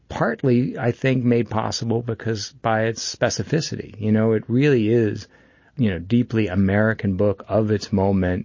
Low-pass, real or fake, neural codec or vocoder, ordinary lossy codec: 7.2 kHz; real; none; MP3, 32 kbps